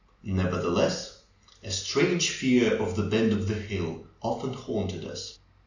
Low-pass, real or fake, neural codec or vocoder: 7.2 kHz; real; none